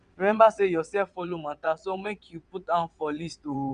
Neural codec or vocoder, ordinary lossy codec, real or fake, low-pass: vocoder, 22.05 kHz, 80 mel bands, WaveNeXt; none; fake; 9.9 kHz